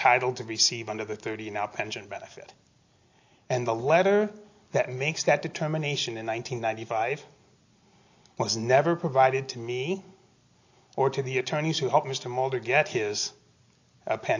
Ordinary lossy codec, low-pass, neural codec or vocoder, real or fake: AAC, 48 kbps; 7.2 kHz; none; real